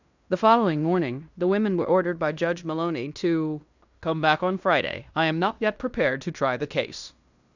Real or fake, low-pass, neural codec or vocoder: fake; 7.2 kHz; codec, 16 kHz in and 24 kHz out, 0.9 kbps, LongCat-Audio-Codec, fine tuned four codebook decoder